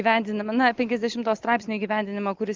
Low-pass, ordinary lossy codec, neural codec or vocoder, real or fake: 7.2 kHz; Opus, 24 kbps; none; real